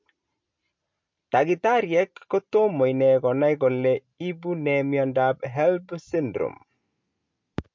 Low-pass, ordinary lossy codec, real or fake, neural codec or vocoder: 7.2 kHz; MP3, 48 kbps; real; none